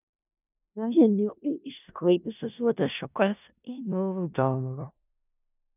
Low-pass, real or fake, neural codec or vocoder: 3.6 kHz; fake; codec, 16 kHz in and 24 kHz out, 0.4 kbps, LongCat-Audio-Codec, four codebook decoder